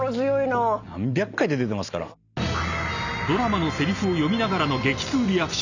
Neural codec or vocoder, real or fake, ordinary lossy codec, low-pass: none; real; none; 7.2 kHz